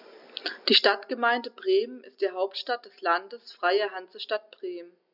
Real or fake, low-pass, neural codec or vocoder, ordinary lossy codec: real; 5.4 kHz; none; none